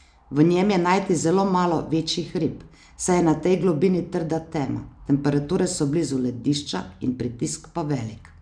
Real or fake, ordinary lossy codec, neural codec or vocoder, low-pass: real; none; none; 9.9 kHz